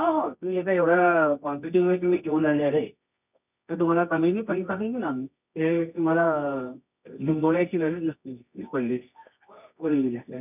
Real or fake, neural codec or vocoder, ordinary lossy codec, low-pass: fake; codec, 24 kHz, 0.9 kbps, WavTokenizer, medium music audio release; none; 3.6 kHz